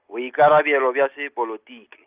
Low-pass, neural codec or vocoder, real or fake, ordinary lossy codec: 3.6 kHz; none; real; Opus, 32 kbps